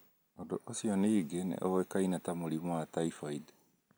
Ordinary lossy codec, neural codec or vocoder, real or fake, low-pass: none; none; real; none